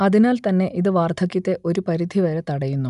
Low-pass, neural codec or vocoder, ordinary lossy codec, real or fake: 10.8 kHz; none; none; real